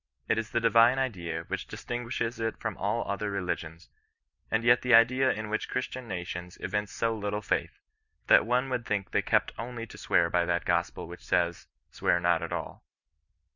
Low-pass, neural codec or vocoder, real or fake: 7.2 kHz; none; real